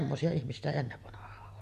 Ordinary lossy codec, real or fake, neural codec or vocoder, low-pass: MP3, 64 kbps; real; none; 14.4 kHz